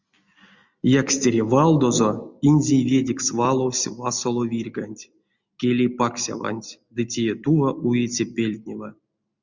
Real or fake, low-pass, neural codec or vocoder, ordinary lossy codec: real; 7.2 kHz; none; Opus, 64 kbps